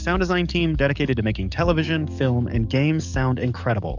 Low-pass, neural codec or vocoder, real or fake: 7.2 kHz; codec, 44.1 kHz, 7.8 kbps, DAC; fake